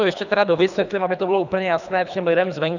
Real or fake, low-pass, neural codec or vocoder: fake; 7.2 kHz; codec, 24 kHz, 3 kbps, HILCodec